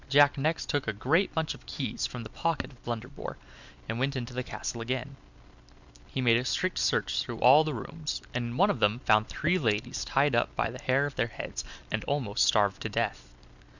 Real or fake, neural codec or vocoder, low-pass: real; none; 7.2 kHz